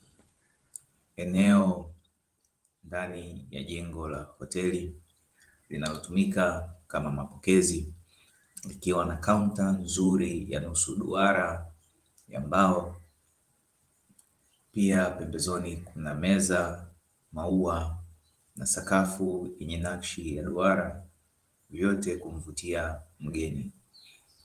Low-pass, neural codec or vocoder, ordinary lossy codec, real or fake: 14.4 kHz; vocoder, 44.1 kHz, 128 mel bands every 512 samples, BigVGAN v2; Opus, 24 kbps; fake